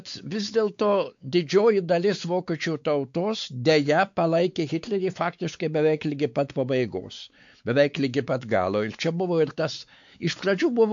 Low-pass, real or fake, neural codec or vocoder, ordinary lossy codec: 7.2 kHz; fake; codec, 16 kHz, 4 kbps, X-Codec, WavLM features, trained on Multilingual LibriSpeech; AAC, 64 kbps